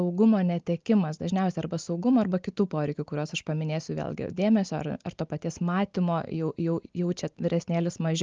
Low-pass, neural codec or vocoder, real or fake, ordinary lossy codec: 7.2 kHz; none; real; Opus, 24 kbps